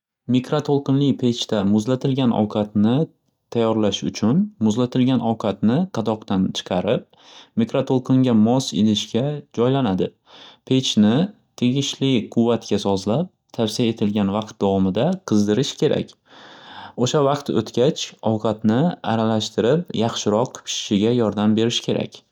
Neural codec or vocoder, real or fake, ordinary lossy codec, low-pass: none; real; none; 19.8 kHz